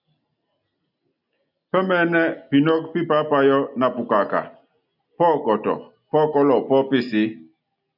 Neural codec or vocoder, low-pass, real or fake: none; 5.4 kHz; real